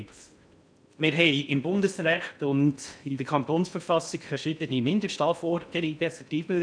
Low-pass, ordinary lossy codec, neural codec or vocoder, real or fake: 9.9 kHz; none; codec, 16 kHz in and 24 kHz out, 0.6 kbps, FocalCodec, streaming, 2048 codes; fake